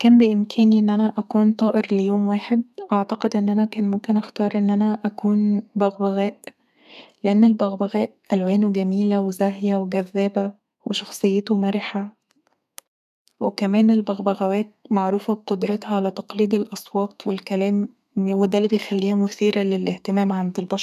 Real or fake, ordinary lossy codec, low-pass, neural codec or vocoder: fake; none; 14.4 kHz; codec, 32 kHz, 1.9 kbps, SNAC